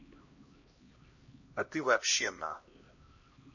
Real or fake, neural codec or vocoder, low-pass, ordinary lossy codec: fake; codec, 16 kHz, 1 kbps, X-Codec, HuBERT features, trained on LibriSpeech; 7.2 kHz; MP3, 32 kbps